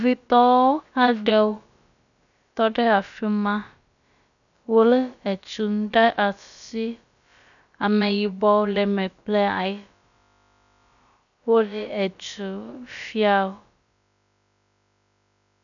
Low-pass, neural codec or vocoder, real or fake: 7.2 kHz; codec, 16 kHz, about 1 kbps, DyCAST, with the encoder's durations; fake